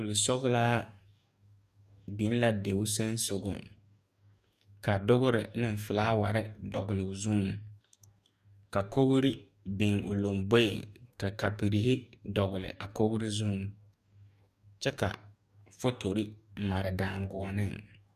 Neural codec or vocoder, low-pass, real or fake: codec, 44.1 kHz, 2.6 kbps, DAC; 14.4 kHz; fake